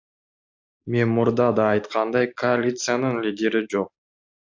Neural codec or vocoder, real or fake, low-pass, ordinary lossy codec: none; real; 7.2 kHz; AAC, 48 kbps